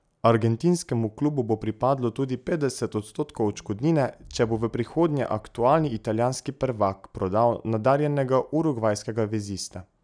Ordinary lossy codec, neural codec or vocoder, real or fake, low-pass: none; none; real; 9.9 kHz